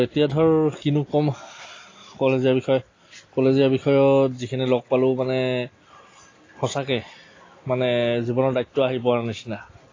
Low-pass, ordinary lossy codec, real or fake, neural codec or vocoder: 7.2 kHz; AAC, 32 kbps; real; none